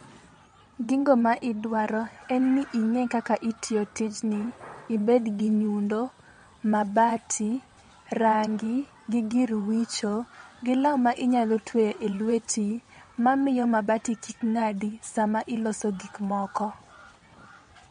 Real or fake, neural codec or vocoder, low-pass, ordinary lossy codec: fake; vocoder, 22.05 kHz, 80 mel bands, WaveNeXt; 9.9 kHz; MP3, 48 kbps